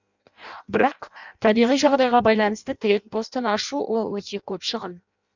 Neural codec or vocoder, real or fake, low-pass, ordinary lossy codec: codec, 16 kHz in and 24 kHz out, 0.6 kbps, FireRedTTS-2 codec; fake; 7.2 kHz; none